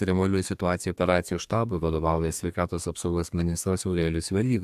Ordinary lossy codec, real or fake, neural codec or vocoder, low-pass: AAC, 96 kbps; fake; codec, 32 kHz, 1.9 kbps, SNAC; 14.4 kHz